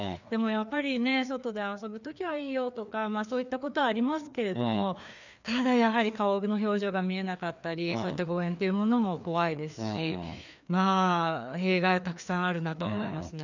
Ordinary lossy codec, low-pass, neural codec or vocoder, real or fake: none; 7.2 kHz; codec, 16 kHz, 2 kbps, FreqCodec, larger model; fake